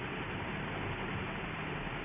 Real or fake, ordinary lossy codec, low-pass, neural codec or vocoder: real; none; 3.6 kHz; none